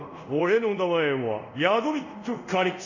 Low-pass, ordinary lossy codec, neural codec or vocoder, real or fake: 7.2 kHz; none; codec, 24 kHz, 0.5 kbps, DualCodec; fake